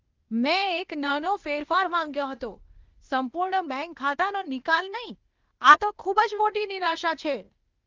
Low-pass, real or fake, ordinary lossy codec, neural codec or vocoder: 7.2 kHz; fake; Opus, 32 kbps; codec, 16 kHz, 0.8 kbps, ZipCodec